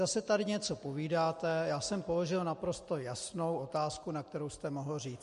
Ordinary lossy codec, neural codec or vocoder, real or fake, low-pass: MP3, 48 kbps; none; real; 14.4 kHz